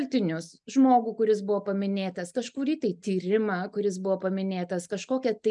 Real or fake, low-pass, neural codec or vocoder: real; 10.8 kHz; none